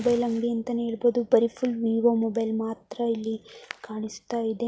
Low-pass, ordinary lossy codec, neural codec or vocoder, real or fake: none; none; none; real